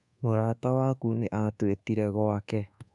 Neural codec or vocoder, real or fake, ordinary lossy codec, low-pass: codec, 24 kHz, 1.2 kbps, DualCodec; fake; none; 10.8 kHz